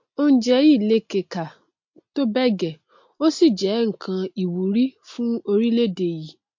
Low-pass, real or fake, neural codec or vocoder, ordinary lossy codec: 7.2 kHz; real; none; MP3, 48 kbps